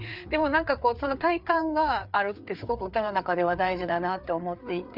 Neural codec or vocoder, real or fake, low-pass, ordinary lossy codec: codec, 16 kHz, 4 kbps, FreqCodec, larger model; fake; 5.4 kHz; none